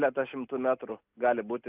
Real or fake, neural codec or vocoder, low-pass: real; none; 3.6 kHz